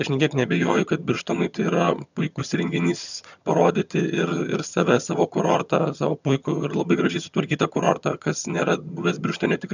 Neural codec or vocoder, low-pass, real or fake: vocoder, 22.05 kHz, 80 mel bands, HiFi-GAN; 7.2 kHz; fake